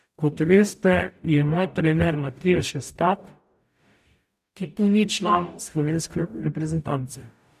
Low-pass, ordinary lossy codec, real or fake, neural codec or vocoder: 14.4 kHz; none; fake; codec, 44.1 kHz, 0.9 kbps, DAC